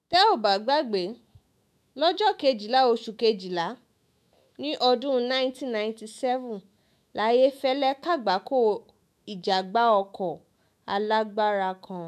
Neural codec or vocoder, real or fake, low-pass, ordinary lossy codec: autoencoder, 48 kHz, 128 numbers a frame, DAC-VAE, trained on Japanese speech; fake; 14.4 kHz; MP3, 96 kbps